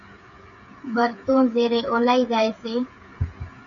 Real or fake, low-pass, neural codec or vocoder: fake; 7.2 kHz; codec, 16 kHz, 8 kbps, FreqCodec, smaller model